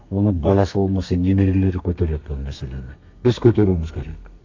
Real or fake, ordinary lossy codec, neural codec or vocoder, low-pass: fake; AAC, 48 kbps; codec, 32 kHz, 1.9 kbps, SNAC; 7.2 kHz